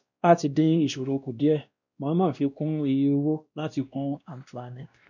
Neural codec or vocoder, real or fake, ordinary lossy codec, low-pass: codec, 16 kHz, 1 kbps, X-Codec, WavLM features, trained on Multilingual LibriSpeech; fake; none; 7.2 kHz